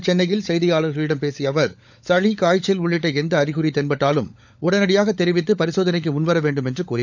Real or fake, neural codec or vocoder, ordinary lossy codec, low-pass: fake; codec, 16 kHz, 16 kbps, FunCodec, trained on LibriTTS, 50 frames a second; none; 7.2 kHz